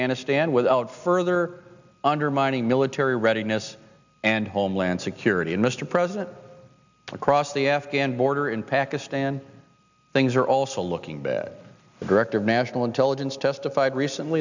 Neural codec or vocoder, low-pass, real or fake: none; 7.2 kHz; real